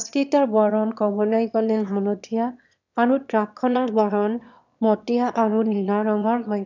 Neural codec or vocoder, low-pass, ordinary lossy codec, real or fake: autoencoder, 22.05 kHz, a latent of 192 numbers a frame, VITS, trained on one speaker; 7.2 kHz; none; fake